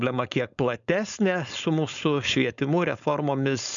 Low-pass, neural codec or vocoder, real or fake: 7.2 kHz; codec, 16 kHz, 4.8 kbps, FACodec; fake